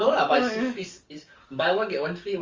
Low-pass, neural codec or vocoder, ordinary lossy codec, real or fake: 7.2 kHz; codec, 44.1 kHz, 7.8 kbps, DAC; Opus, 32 kbps; fake